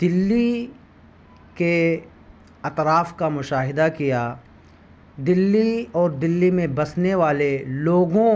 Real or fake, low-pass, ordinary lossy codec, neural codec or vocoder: real; none; none; none